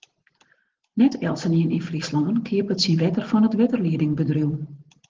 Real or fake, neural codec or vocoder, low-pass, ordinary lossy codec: real; none; 7.2 kHz; Opus, 16 kbps